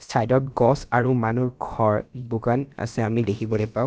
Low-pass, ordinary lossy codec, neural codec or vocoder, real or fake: none; none; codec, 16 kHz, about 1 kbps, DyCAST, with the encoder's durations; fake